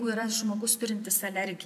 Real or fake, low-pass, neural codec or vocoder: fake; 14.4 kHz; vocoder, 44.1 kHz, 128 mel bands every 256 samples, BigVGAN v2